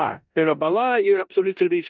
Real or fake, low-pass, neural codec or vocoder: fake; 7.2 kHz; codec, 16 kHz in and 24 kHz out, 0.9 kbps, LongCat-Audio-Codec, four codebook decoder